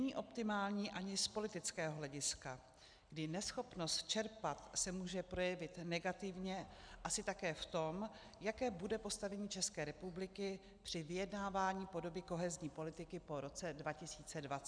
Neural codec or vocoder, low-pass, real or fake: none; 9.9 kHz; real